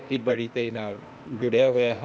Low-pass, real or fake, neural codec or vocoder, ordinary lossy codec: none; fake; codec, 16 kHz, 0.8 kbps, ZipCodec; none